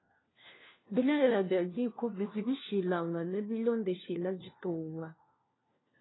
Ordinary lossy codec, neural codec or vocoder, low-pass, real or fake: AAC, 16 kbps; codec, 16 kHz, 1 kbps, FunCodec, trained on LibriTTS, 50 frames a second; 7.2 kHz; fake